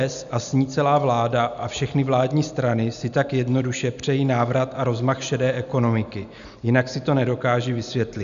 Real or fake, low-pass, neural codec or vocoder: real; 7.2 kHz; none